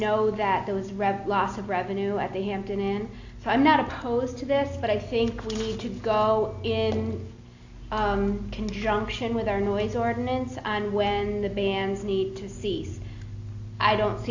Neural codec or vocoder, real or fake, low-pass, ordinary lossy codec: none; real; 7.2 kHz; AAC, 48 kbps